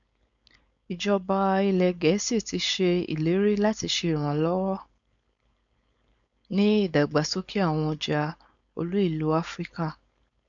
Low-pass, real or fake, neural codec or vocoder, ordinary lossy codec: 7.2 kHz; fake; codec, 16 kHz, 4.8 kbps, FACodec; none